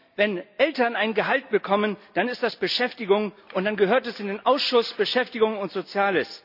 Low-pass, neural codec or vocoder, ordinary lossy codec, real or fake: 5.4 kHz; none; none; real